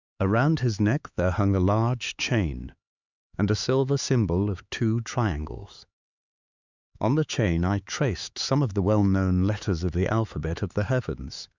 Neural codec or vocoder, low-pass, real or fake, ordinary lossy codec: codec, 16 kHz, 4 kbps, X-Codec, HuBERT features, trained on LibriSpeech; 7.2 kHz; fake; Opus, 64 kbps